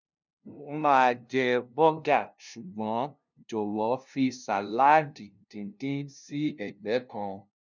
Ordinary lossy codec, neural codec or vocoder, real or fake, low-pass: none; codec, 16 kHz, 0.5 kbps, FunCodec, trained on LibriTTS, 25 frames a second; fake; 7.2 kHz